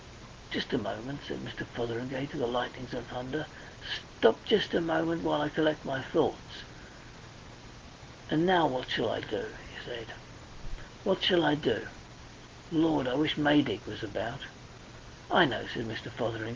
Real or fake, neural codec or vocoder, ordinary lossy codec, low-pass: real; none; Opus, 16 kbps; 7.2 kHz